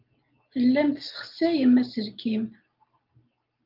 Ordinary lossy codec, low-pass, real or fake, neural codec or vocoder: Opus, 24 kbps; 5.4 kHz; real; none